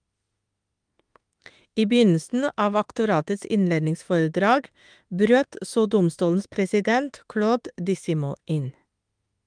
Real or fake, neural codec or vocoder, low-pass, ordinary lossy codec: fake; autoencoder, 48 kHz, 32 numbers a frame, DAC-VAE, trained on Japanese speech; 9.9 kHz; Opus, 32 kbps